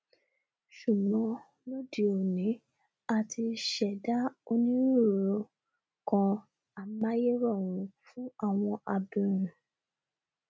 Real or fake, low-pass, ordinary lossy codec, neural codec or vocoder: real; none; none; none